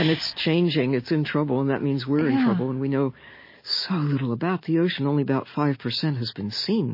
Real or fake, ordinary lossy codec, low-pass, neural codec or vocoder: real; MP3, 24 kbps; 5.4 kHz; none